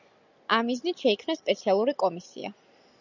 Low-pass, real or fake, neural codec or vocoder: 7.2 kHz; real; none